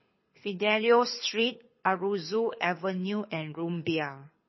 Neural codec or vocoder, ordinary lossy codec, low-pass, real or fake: codec, 24 kHz, 6 kbps, HILCodec; MP3, 24 kbps; 7.2 kHz; fake